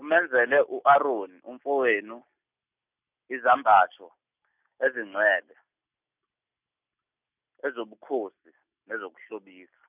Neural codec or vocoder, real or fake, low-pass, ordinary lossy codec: none; real; 3.6 kHz; none